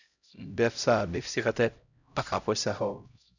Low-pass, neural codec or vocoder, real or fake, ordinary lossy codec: 7.2 kHz; codec, 16 kHz, 0.5 kbps, X-Codec, HuBERT features, trained on LibriSpeech; fake; none